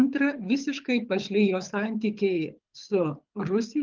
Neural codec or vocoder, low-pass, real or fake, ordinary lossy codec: codec, 24 kHz, 6 kbps, HILCodec; 7.2 kHz; fake; Opus, 24 kbps